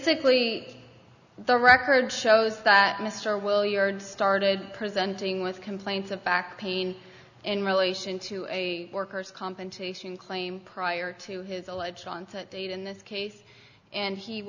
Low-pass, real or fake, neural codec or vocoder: 7.2 kHz; real; none